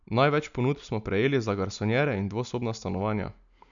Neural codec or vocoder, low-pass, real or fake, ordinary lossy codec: none; 7.2 kHz; real; none